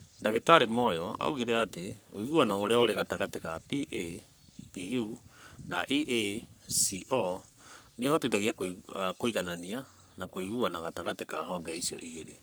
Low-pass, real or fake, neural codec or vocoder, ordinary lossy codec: none; fake; codec, 44.1 kHz, 3.4 kbps, Pupu-Codec; none